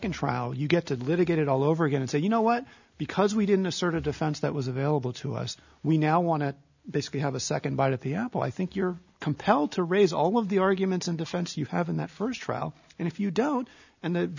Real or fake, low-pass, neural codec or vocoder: real; 7.2 kHz; none